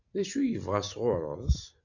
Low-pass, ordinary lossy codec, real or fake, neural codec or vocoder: 7.2 kHz; MP3, 64 kbps; real; none